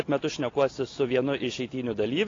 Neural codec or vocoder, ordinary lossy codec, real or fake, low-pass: none; AAC, 32 kbps; real; 7.2 kHz